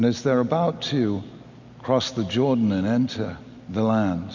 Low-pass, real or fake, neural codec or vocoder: 7.2 kHz; real; none